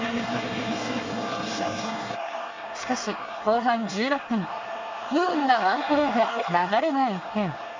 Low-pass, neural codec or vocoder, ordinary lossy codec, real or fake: 7.2 kHz; codec, 24 kHz, 1 kbps, SNAC; MP3, 64 kbps; fake